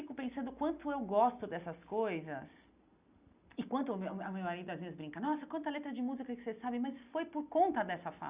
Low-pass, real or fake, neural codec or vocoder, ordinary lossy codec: 3.6 kHz; real; none; Opus, 64 kbps